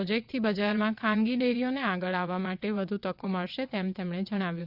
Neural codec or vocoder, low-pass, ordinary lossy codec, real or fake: vocoder, 22.05 kHz, 80 mel bands, WaveNeXt; 5.4 kHz; Opus, 64 kbps; fake